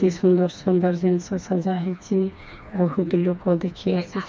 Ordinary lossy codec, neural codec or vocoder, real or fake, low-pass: none; codec, 16 kHz, 2 kbps, FreqCodec, smaller model; fake; none